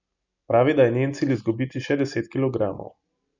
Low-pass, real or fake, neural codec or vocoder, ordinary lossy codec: 7.2 kHz; real; none; none